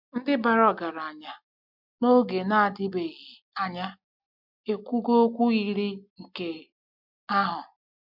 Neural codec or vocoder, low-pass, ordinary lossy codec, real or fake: none; 5.4 kHz; MP3, 48 kbps; real